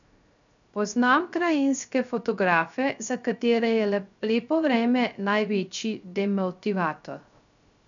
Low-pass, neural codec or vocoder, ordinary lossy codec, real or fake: 7.2 kHz; codec, 16 kHz, 0.3 kbps, FocalCodec; none; fake